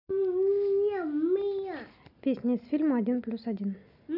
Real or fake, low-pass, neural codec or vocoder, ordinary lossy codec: real; 5.4 kHz; none; none